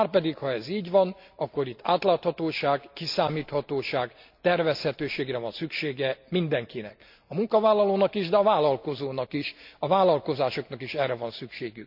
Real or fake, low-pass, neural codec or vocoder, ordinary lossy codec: real; 5.4 kHz; none; none